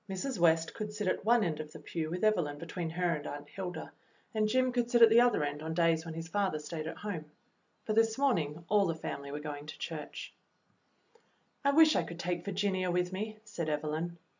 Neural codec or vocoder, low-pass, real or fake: none; 7.2 kHz; real